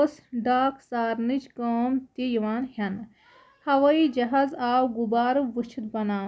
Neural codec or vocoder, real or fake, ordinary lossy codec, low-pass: none; real; none; none